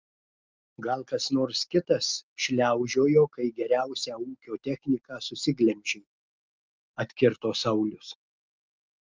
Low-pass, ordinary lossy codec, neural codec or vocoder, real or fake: 7.2 kHz; Opus, 24 kbps; none; real